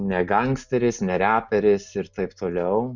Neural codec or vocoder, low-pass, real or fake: none; 7.2 kHz; real